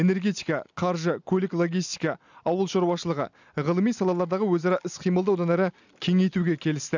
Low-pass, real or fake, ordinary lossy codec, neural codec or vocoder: 7.2 kHz; real; none; none